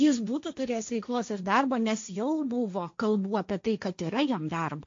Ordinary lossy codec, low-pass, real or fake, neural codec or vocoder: MP3, 48 kbps; 7.2 kHz; fake; codec, 16 kHz, 1.1 kbps, Voila-Tokenizer